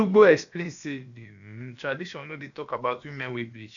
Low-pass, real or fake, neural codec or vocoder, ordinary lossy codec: 7.2 kHz; fake; codec, 16 kHz, about 1 kbps, DyCAST, with the encoder's durations; none